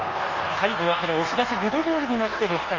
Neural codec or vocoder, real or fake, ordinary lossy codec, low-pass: codec, 24 kHz, 1.2 kbps, DualCodec; fake; Opus, 32 kbps; 7.2 kHz